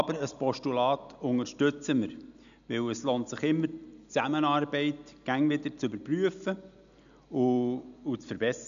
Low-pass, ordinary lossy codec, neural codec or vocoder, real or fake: 7.2 kHz; none; none; real